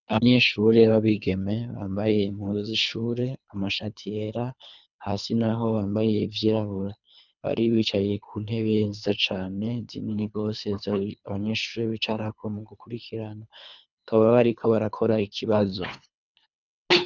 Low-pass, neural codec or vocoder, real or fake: 7.2 kHz; codec, 24 kHz, 3 kbps, HILCodec; fake